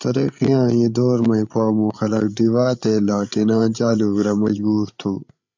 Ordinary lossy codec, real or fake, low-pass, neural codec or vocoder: MP3, 64 kbps; fake; 7.2 kHz; codec, 16 kHz, 8 kbps, FreqCodec, larger model